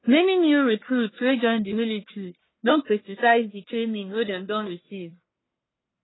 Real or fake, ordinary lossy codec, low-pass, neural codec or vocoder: fake; AAC, 16 kbps; 7.2 kHz; codec, 44.1 kHz, 1.7 kbps, Pupu-Codec